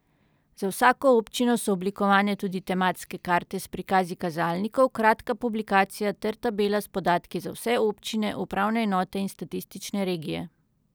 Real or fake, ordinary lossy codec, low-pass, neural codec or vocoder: real; none; none; none